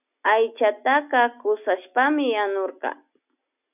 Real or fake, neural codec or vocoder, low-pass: real; none; 3.6 kHz